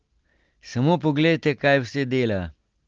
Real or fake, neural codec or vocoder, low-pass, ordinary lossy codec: real; none; 7.2 kHz; Opus, 16 kbps